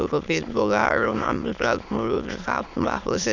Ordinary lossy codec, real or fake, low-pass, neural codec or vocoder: none; fake; 7.2 kHz; autoencoder, 22.05 kHz, a latent of 192 numbers a frame, VITS, trained on many speakers